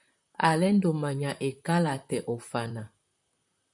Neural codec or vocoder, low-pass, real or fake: vocoder, 44.1 kHz, 128 mel bands, Pupu-Vocoder; 10.8 kHz; fake